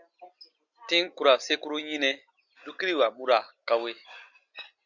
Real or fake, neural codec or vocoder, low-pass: real; none; 7.2 kHz